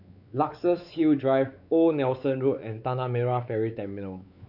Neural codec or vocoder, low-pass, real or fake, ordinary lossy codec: codec, 16 kHz, 4 kbps, X-Codec, WavLM features, trained on Multilingual LibriSpeech; 5.4 kHz; fake; none